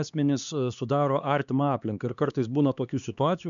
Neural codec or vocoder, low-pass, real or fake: codec, 16 kHz, 2 kbps, X-Codec, HuBERT features, trained on LibriSpeech; 7.2 kHz; fake